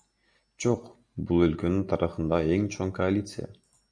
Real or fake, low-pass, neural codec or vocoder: real; 9.9 kHz; none